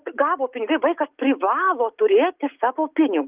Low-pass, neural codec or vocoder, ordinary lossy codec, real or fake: 3.6 kHz; none; Opus, 32 kbps; real